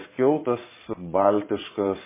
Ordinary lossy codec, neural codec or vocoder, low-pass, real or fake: MP3, 16 kbps; vocoder, 22.05 kHz, 80 mel bands, Vocos; 3.6 kHz; fake